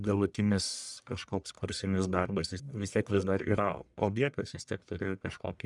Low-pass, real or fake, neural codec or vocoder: 10.8 kHz; fake; codec, 44.1 kHz, 1.7 kbps, Pupu-Codec